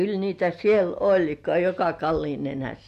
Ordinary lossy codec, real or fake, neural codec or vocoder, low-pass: MP3, 64 kbps; real; none; 14.4 kHz